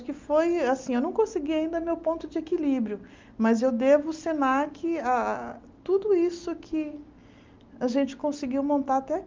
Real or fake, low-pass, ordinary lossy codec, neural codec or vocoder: real; 7.2 kHz; Opus, 24 kbps; none